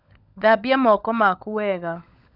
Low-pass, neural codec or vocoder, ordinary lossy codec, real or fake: 5.4 kHz; codec, 16 kHz, 16 kbps, FunCodec, trained on LibriTTS, 50 frames a second; none; fake